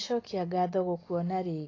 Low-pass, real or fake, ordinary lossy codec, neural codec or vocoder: 7.2 kHz; real; AAC, 32 kbps; none